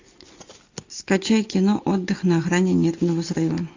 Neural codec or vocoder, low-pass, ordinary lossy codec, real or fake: none; 7.2 kHz; AAC, 48 kbps; real